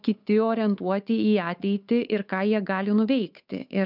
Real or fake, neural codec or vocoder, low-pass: fake; codec, 16 kHz, 8 kbps, FunCodec, trained on Chinese and English, 25 frames a second; 5.4 kHz